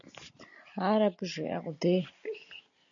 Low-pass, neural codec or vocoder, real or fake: 7.2 kHz; none; real